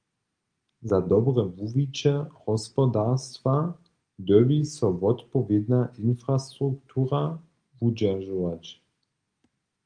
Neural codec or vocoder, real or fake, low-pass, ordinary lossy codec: none; real; 9.9 kHz; Opus, 32 kbps